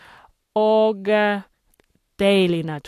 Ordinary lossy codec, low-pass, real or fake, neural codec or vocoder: AAC, 64 kbps; 14.4 kHz; fake; codec, 44.1 kHz, 7.8 kbps, Pupu-Codec